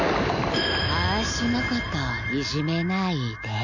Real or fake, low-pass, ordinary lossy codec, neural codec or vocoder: real; 7.2 kHz; none; none